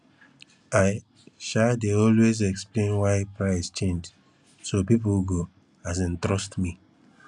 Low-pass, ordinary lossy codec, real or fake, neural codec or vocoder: 10.8 kHz; none; real; none